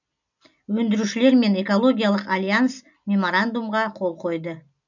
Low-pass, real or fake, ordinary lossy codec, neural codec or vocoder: 7.2 kHz; real; none; none